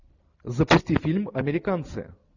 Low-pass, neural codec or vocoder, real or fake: 7.2 kHz; none; real